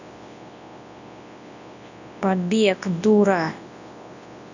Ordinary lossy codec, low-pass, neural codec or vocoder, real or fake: none; 7.2 kHz; codec, 24 kHz, 0.9 kbps, WavTokenizer, large speech release; fake